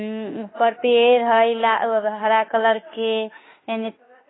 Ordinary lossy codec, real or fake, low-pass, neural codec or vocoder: AAC, 16 kbps; fake; 7.2 kHz; autoencoder, 48 kHz, 32 numbers a frame, DAC-VAE, trained on Japanese speech